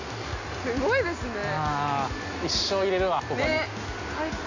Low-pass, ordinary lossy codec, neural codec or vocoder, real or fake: 7.2 kHz; none; none; real